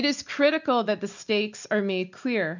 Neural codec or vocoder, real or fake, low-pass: none; real; 7.2 kHz